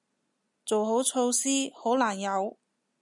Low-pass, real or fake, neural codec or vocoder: 10.8 kHz; real; none